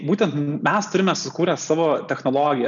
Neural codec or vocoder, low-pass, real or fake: none; 7.2 kHz; real